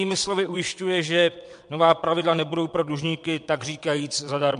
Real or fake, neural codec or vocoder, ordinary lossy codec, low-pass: fake; vocoder, 22.05 kHz, 80 mel bands, WaveNeXt; MP3, 64 kbps; 9.9 kHz